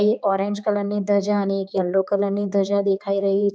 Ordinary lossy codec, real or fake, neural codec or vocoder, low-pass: none; fake; codec, 16 kHz, 4 kbps, X-Codec, HuBERT features, trained on general audio; none